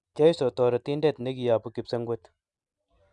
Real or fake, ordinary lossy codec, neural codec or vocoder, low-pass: real; none; none; 10.8 kHz